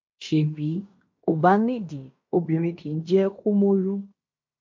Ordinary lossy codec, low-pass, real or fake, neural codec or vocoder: MP3, 48 kbps; 7.2 kHz; fake; codec, 16 kHz in and 24 kHz out, 0.9 kbps, LongCat-Audio-Codec, fine tuned four codebook decoder